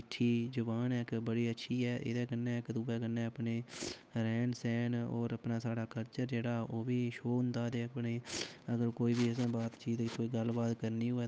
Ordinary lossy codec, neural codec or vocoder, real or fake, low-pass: none; codec, 16 kHz, 8 kbps, FunCodec, trained on Chinese and English, 25 frames a second; fake; none